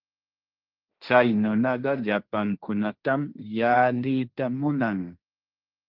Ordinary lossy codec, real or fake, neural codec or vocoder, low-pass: Opus, 32 kbps; fake; codec, 16 kHz, 1.1 kbps, Voila-Tokenizer; 5.4 kHz